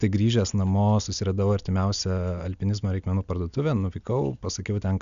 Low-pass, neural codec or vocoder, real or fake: 7.2 kHz; none; real